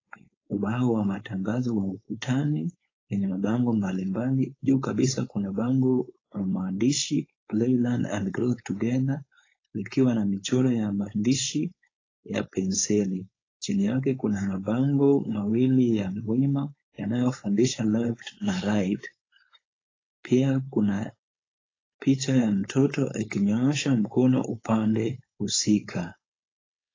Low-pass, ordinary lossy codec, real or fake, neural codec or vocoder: 7.2 kHz; AAC, 32 kbps; fake; codec, 16 kHz, 4.8 kbps, FACodec